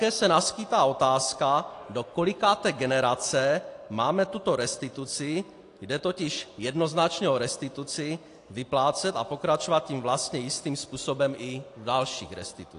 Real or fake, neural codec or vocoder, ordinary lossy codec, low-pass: real; none; AAC, 48 kbps; 10.8 kHz